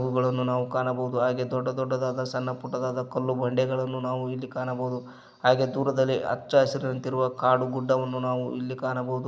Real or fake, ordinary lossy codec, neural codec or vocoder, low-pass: real; none; none; none